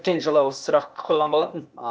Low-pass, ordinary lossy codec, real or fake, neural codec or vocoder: none; none; fake; codec, 16 kHz, 0.8 kbps, ZipCodec